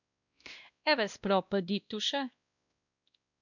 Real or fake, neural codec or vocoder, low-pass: fake; codec, 16 kHz, 1 kbps, X-Codec, WavLM features, trained on Multilingual LibriSpeech; 7.2 kHz